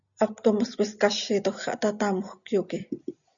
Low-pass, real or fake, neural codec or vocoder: 7.2 kHz; real; none